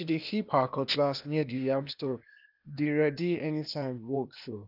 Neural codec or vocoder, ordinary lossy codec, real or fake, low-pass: codec, 16 kHz, 0.8 kbps, ZipCodec; none; fake; 5.4 kHz